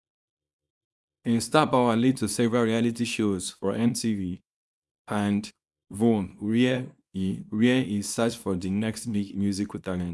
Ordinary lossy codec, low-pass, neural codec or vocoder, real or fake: none; none; codec, 24 kHz, 0.9 kbps, WavTokenizer, small release; fake